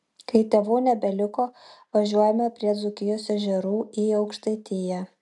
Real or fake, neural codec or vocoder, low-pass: real; none; 10.8 kHz